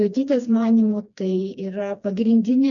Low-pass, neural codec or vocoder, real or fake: 7.2 kHz; codec, 16 kHz, 2 kbps, FreqCodec, smaller model; fake